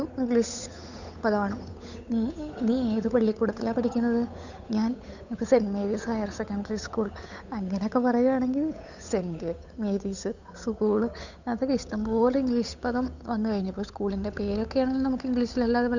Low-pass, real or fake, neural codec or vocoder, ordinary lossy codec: 7.2 kHz; fake; codec, 16 kHz, 8 kbps, FunCodec, trained on Chinese and English, 25 frames a second; none